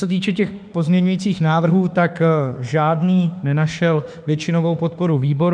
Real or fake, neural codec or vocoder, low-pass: fake; autoencoder, 48 kHz, 32 numbers a frame, DAC-VAE, trained on Japanese speech; 9.9 kHz